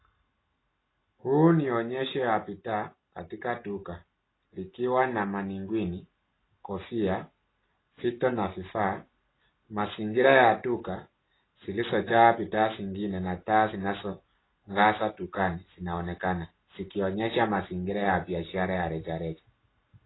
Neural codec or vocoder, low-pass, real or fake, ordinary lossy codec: none; 7.2 kHz; real; AAC, 16 kbps